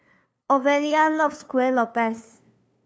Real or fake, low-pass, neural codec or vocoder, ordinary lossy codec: fake; none; codec, 16 kHz, 2 kbps, FunCodec, trained on LibriTTS, 25 frames a second; none